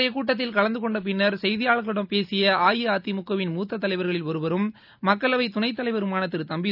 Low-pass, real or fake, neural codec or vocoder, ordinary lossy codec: 5.4 kHz; real; none; none